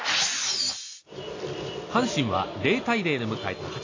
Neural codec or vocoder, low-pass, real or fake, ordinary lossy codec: none; 7.2 kHz; real; AAC, 32 kbps